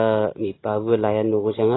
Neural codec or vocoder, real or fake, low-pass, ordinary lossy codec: none; real; 7.2 kHz; AAC, 16 kbps